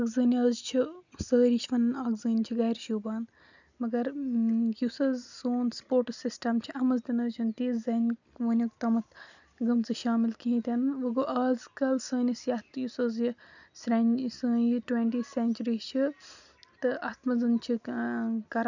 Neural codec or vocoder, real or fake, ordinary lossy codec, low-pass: none; real; none; 7.2 kHz